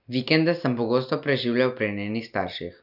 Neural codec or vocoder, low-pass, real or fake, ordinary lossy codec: none; 5.4 kHz; real; none